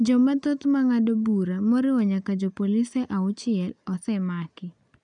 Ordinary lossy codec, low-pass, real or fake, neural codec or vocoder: none; 9.9 kHz; real; none